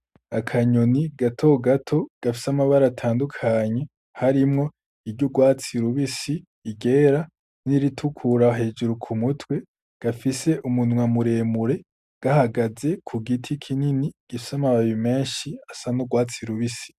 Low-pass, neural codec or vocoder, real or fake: 14.4 kHz; none; real